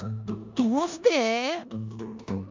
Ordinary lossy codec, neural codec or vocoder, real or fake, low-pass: MP3, 64 kbps; codec, 16 kHz in and 24 kHz out, 0.9 kbps, LongCat-Audio-Codec, four codebook decoder; fake; 7.2 kHz